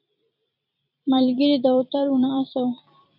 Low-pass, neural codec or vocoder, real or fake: 5.4 kHz; vocoder, 44.1 kHz, 128 mel bands every 256 samples, BigVGAN v2; fake